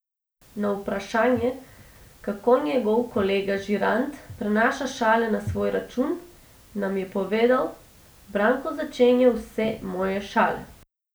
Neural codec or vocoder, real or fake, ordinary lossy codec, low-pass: none; real; none; none